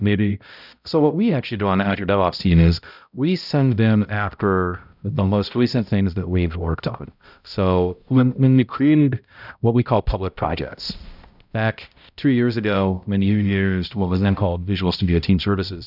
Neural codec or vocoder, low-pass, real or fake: codec, 16 kHz, 0.5 kbps, X-Codec, HuBERT features, trained on balanced general audio; 5.4 kHz; fake